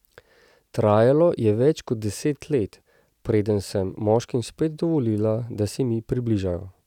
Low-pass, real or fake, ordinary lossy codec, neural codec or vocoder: 19.8 kHz; real; none; none